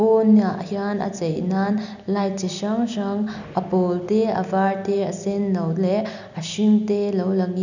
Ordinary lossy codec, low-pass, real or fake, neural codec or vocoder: none; 7.2 kHz; real; none